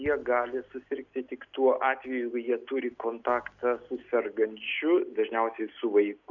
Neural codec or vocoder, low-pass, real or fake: none; 7.2 kHz; real